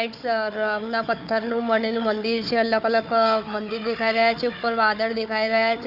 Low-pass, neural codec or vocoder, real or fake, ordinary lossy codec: 5.4 kHz; codec, 16 kHz, 4 kbps, FunCodec, trained on Chinese and English, 50 frames a second; fake; none